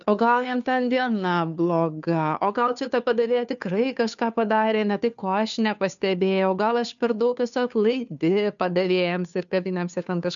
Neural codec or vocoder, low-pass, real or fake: codec, 16 kHz, 2 kbps, FunCodec, trained on Chinese and English, 25 frames a second; 7.2 kHz; fake